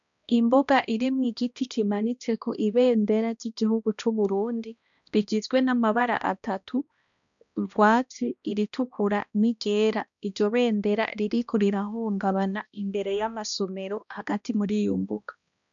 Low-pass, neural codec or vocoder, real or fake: 7.2 kHz; codec, 16 kHz, 1 kbps, X-Codec, HuBERT features, trained on balanced general audio; fake